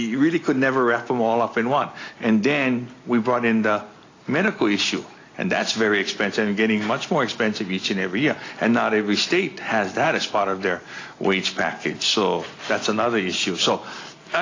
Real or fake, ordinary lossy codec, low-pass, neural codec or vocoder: real; AAC, 32 kbps; 7.2 kHz; none